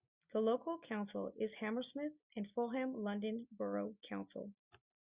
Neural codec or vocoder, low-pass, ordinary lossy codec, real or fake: none; 3.6 kHz; Opus, 64 kbps; real